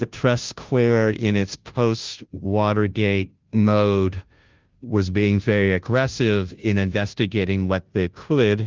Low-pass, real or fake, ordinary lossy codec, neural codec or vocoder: 7.2 kHz; fake; Opus, 32 kbps; codec, 16 kHz, 0.5 kbps, FunCodec, trained on Chinese and English, 25 frames a second